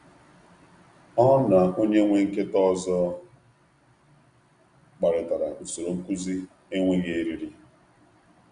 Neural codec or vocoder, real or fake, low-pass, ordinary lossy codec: none; real; 9.9 kHz; Opus, 64 kbps